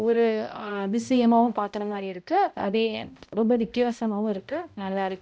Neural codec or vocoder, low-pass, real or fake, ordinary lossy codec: codec, 16 kHz, 0.5 kbps, X-Codec, HuBERT features, trained on balanced general audio; none; fake; none